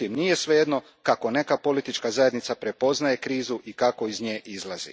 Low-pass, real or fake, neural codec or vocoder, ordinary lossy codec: none; real; none; none